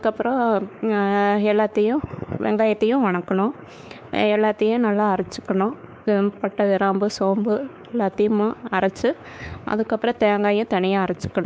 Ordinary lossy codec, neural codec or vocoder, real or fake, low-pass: none; codec, 16 kHz, 4 kbps, X-Codec, WavLM features, trained on Multilingual LibriSpeech; fake; none